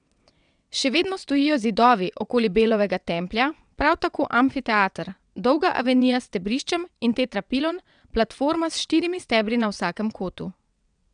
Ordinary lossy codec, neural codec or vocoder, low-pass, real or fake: none; vocoder, 22.05 kHz, 80 mel bands, WaveNeXt; 9.9 kHz; fake